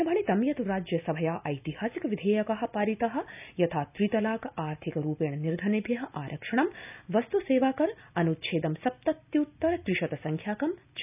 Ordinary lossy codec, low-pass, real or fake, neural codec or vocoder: none; 3.6 kHz; real; none